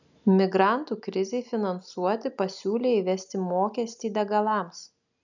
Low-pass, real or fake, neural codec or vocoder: 7.2 kHz; real; none